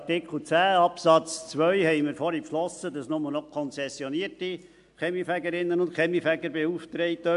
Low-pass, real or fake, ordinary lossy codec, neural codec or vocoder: 10.8 kHz; real; AAC, 64 kbps; none